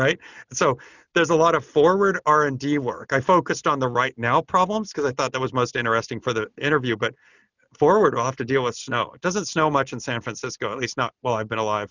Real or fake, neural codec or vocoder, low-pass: real; none; 7.2 kHz